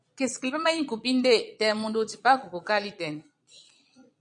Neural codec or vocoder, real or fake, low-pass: vocoder, 22.05 kHz, 80 mel bands, Vocos; fake; 9.9 kHz